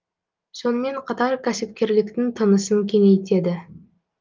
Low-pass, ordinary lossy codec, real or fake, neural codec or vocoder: 7.2 kHz; Opus, 32 kbps; real; none